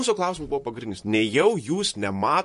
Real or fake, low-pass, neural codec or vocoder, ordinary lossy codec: fake; 14.4 kHz; vocoder, 44.1 kHz, 128 mel bands, Pupu-Vocoder; MP3, 48 kbps